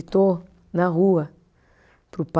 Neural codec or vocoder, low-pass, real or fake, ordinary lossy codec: none; none; real; none